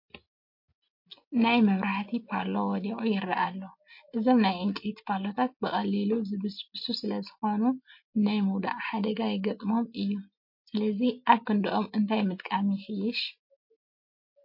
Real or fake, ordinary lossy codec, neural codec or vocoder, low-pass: real; MP3, 32 kbps; none; 5.4 kHz